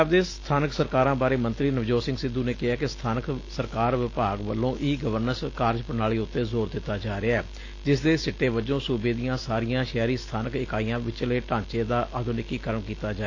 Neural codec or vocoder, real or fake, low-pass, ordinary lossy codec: none; real; 7.2 kHz; AAC, 32 kbps